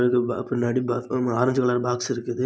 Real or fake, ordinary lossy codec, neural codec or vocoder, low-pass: real; none; none; none